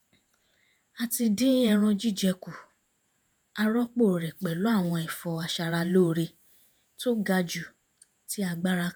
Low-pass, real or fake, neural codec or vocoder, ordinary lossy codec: none; fake; vocoder, 48 kHz, 128 mel bands, Vocos; none